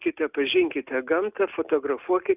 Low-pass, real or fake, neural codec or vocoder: 3.6 kHz; real; none